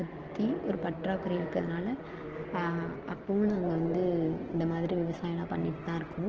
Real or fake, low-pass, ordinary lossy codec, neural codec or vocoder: real; 7.2 kHz; Opus, 16 kbps; none